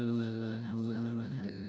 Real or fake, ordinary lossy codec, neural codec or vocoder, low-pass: fake; none; codec, 16 kHz, 0.5 kbps, FreqCodec, larger model; none